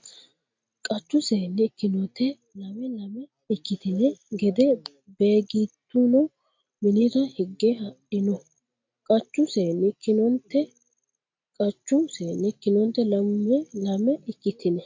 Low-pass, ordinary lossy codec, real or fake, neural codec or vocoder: 7.2 kHz; MP3, 48 kbps; real; none